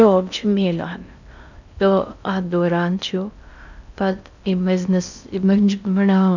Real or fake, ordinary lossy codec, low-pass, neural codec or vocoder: fake; none; 7.2 kHz; codec, 16 kHz in and 24 kHz out, 0.6 kbps, FocalCodec, streaming, 2048 codes